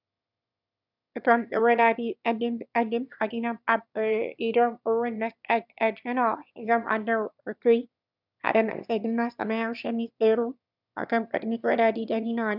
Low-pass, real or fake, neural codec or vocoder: 5.4 kHz; fake; autoencoder, 22.05 kHz, a latent of 192 numbers a frame, VITS, trained on one speaker